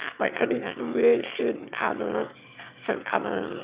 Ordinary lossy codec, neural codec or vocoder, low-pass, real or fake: Opus, 64 kbps; autoencoder, 22.05 kHz, a latent of 192 numbers a frame, VITS, trained on one speaker; 3.6 kHz; fake